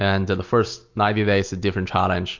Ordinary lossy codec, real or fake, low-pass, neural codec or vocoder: MP3, 48 kbps; real; 7.2 kHz; none